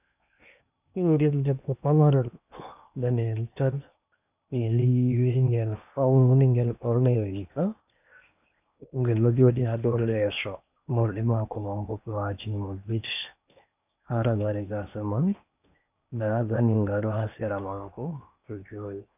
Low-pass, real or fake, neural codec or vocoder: 3.6 kHz; fake; codec, 16 kHz in and 24 kHz out, 0.8 kbps, FocalCodec, streaming, 65536 codes